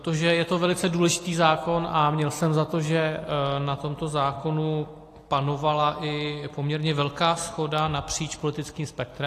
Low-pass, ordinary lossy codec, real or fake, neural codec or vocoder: 14.4 kHz; AAC, 48 kbps; real; none